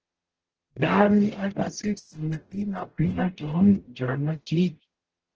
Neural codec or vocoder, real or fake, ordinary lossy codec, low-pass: codec, 44.1 kHz, 0.9 kbps, DAC; fake; Opus, 16 kbps; 7.2 kHz